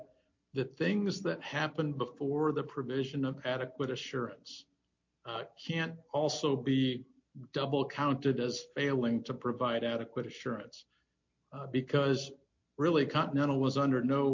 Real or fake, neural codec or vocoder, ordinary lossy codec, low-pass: real; none; MP3, 48 kbps; 7.2 kHz